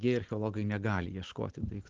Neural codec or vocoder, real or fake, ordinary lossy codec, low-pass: none; real; Opus, 16 kbps; 7.2 kHz